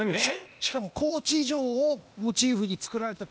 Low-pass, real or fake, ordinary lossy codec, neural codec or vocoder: none; fake; none; codec, 16 kHz, 0.8 kbps, ZipCodec